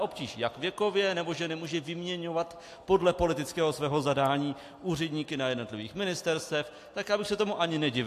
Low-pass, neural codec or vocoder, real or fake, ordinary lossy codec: 14.4 kHz; none; real; AAC, 64 kbps